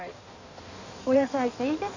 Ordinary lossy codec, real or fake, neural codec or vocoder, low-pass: none; fake; codec, 16 kHz in and 24 kHz out, 1.1 kbps, FireRedTTS-2 codec; 7.2 kHz